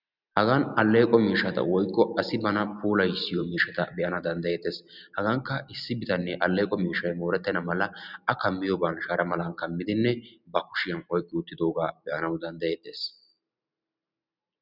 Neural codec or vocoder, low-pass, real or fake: none; 5.4 kHz; real